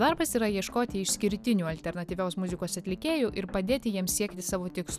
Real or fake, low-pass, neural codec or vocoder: real; 14.4 kHz; none